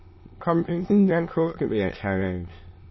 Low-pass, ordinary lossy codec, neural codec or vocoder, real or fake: 7.2 kHz; MP3, 24 kbps; autoencoder, 22.05 kHz, a latent of 192 numbers a frame, VITS, trained on many speakers; fake